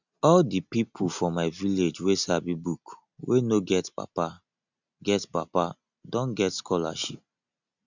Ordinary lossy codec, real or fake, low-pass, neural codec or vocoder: none; real; 7.2 kHz; none